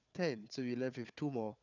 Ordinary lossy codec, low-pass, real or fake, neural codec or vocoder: none; 7.2 kHz; fake; codec, 16 kHz, 4 kbps, FunCodec, trained on Chinese and English, 50 frames a second